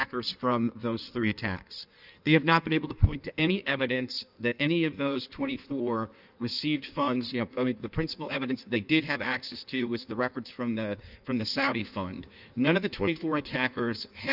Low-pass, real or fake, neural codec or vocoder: 5.4 kHz; fake; codec, 16 kHz in and 24 kHz out, 1.1 kbps, FireRedTTS-2 codec